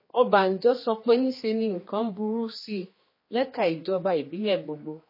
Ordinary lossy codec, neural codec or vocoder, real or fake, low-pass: MP3, 24 kbps; codec, 16 kHz, 2 kbps, X-Codec, HuBERT features, trained on general audio; fake; 5.4 kHz